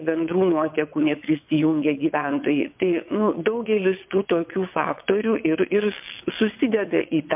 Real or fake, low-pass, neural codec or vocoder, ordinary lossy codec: fake; 3.6 kHz; vocoder, 22.05 kHz, 80 mel bands, WaveNeXt; MP3, 32 kbps